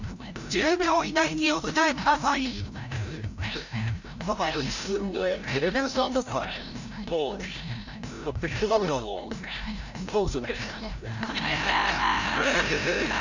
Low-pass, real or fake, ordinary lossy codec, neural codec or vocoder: 7.2 kHz; fake; none; codec, 16 kHz, 0.5 kbps, FreqCodec, larger model